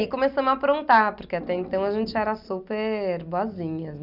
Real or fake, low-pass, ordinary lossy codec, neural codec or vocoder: real; 5.4 kHz; none; none